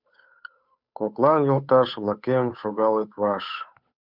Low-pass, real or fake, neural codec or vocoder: 5.4 kHz; fake; codec, 16 kHz, 8 kbps, FunCodec, trained on Chinese and English, 25 frames a second